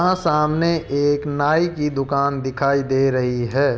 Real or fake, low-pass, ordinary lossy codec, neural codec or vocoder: real; none; none; none